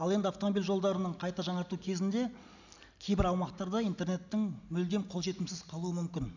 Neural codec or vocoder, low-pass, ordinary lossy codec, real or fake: none; 7.2 kHz; none; real